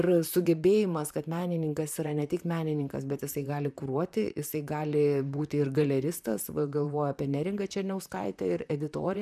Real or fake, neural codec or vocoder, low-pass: fake; vocoder, 44.1 kHz, 128 mel bands, Pupu-Vocoder; 14.4 kHz